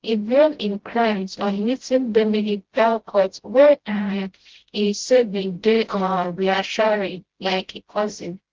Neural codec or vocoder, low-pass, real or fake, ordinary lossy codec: codec, 16 kHz, 0.5 kbps, FreqCodec, smaller model; 7.2 kHz; fake; Opus, 16 kbps